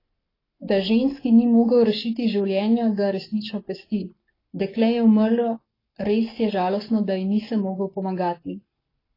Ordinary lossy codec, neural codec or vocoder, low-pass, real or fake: AAC, 24 kbps; codec, 44.1 kHz, 7.8 kbps, Pupu-Codec; 5.4 kHz; fake